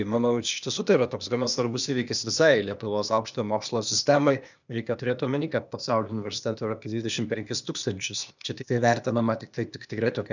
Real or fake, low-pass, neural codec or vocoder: fake; 7.2 kHz; codec, 16 kHz, 0.8 kbps, ZipCodec